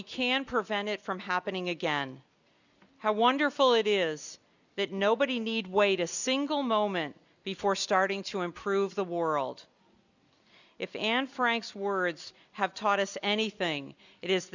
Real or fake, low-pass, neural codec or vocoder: real; 7.2 kHz; none